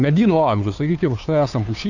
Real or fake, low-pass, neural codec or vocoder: fake; 7.2 kHz; codec, 16 kHz, 2 kbps, FunCodec, trained on Chinese and English, 25 frames a second